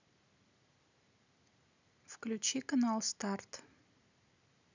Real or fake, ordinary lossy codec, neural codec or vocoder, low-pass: real; none; none; 7.2 kHz